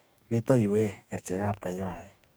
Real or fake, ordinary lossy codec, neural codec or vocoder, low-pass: fake; none; codec, 44.1 kHz, 2.6 kbps, DAC; none